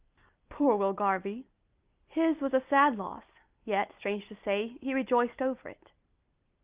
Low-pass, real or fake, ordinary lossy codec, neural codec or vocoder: 3.6 kHz; real; Opus, 24 kbps; none